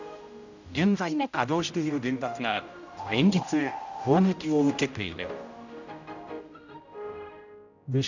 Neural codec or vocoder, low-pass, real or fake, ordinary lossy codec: codec, 16 kHz, 0.5 kbps, X-Codec, HuBERT features, trained on general audio; 7.2 kHz; fake; none